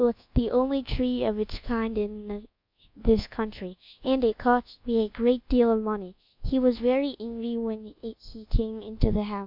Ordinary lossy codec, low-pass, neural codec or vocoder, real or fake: MP3, 48 kbps; 5.4 kHz; codec, 24 kHz, 1.2 kbps, DualCodec; fake